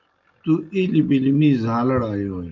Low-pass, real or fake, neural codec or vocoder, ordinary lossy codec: 7.2 kHz; real; none; Opus, 32 kbps